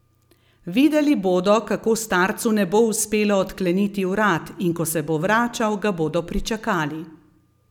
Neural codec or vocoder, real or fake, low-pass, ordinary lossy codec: none; real; 19.8 kHz; none